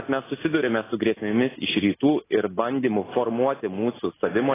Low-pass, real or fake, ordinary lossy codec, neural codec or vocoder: 3.6 kHz; real; AAC, 16 kbps; none